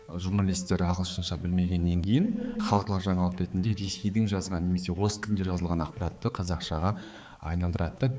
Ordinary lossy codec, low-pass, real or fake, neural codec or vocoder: none; none; fake; codec, 16 kHz, 4 kbps, X-Codec, HuBERT features, trained on balanced general audio